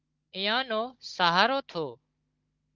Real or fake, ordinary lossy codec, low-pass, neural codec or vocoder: fake; Opus, 24 kbps; 7.2 kHz; codec, 16 kHz, 16 kbps, FunCodec, trained on Chinese and English, 50 frames a second